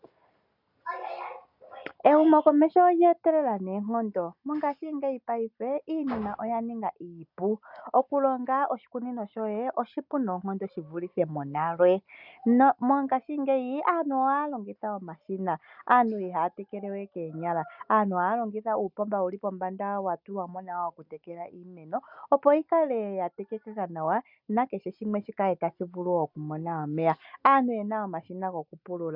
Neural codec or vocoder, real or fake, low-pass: none; real; 5.4 kHz